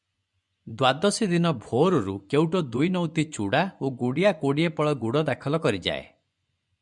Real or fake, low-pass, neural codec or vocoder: fake; 10.8 kHz; vocoder, 24 kHz, 100 mel bands, Vocos